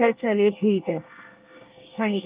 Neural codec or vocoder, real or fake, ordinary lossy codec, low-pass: codec, 24 kHz, 1 kbps, SNAC; fake; Opus, 24 kbps; 3.6 kHz